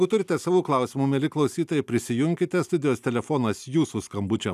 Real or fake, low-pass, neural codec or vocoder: real; 14.4 kHz; none